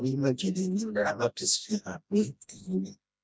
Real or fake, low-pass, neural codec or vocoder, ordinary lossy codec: fake; none; codec, 16 kHz, 1 kbps, FreqCodec, smaller model; none